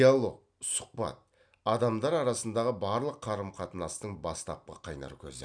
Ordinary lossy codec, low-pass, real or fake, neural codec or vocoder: none; 9.9 kHz; real; none